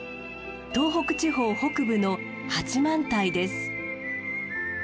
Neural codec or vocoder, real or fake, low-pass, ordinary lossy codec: none; real; none; none